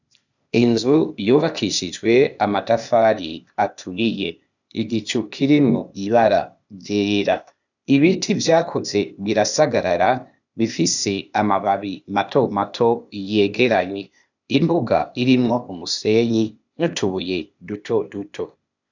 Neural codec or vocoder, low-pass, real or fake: codec, 16 kHz, 0.8 kbps, ZipCodec; 7.2 kHz; fake